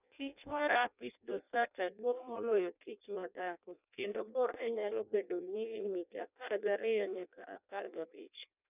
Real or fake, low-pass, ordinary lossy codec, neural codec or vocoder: fake; 3.6 kHz; none; codec, 16 kHz in and 24 kHz out, 0.6 kbps, FireRedTTS-2 codec